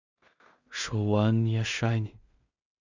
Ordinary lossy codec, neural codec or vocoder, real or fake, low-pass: Opus, 64 kbps; codec, 16 kHz in and 24 kHz out, 0.4 kbps, LongCat-Audio-Codec, two codebook decoder; fake; 7.2 kHz